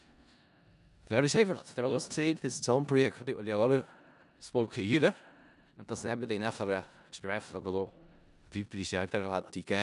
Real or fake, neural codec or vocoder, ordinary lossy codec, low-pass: fake; codec, 16 kHz in and 24 kHz out, 0.4 kbps, LongCat-Audio-Codec, four codebook decoder; none; 10.8 kHz